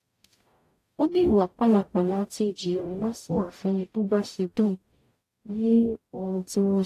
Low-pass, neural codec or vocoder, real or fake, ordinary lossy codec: 14.4 kHz; codec, 44.1 kHz, 0.9 kbps, DAC; fake; AAC, 64 kbps